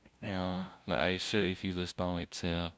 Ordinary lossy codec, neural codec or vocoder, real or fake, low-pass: none; codec, 16 kHz, 0.5 kbps, FunCodec, trained on LibriTTS, 25 frames a second; fake; none